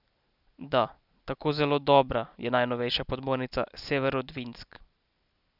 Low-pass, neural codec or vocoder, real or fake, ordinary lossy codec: 5.4 kHz; none; real; none